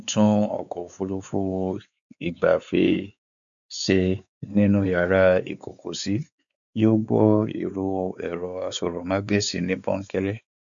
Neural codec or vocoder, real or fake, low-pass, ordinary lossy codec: codec, 16 kHz, 4 kbps, X-Codec, WavLM features, trained on Multilingual LibriSpeech; fake; 7.2 kHz; none